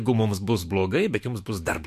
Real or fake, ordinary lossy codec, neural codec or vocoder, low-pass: fake; MP3, 64 kbps; autoencoder, 48 kHz, 128 numbers a frame, DAC-VAE, trained on Japanese speech; 14.4 kHz